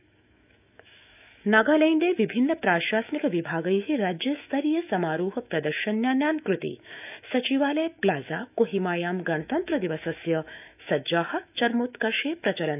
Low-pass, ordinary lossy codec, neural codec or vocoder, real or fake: 3.6 kHz; none; autoencoder, 48 kHz, 128 numbers a frame, DAC-VAE, trained on Japanese speech; fake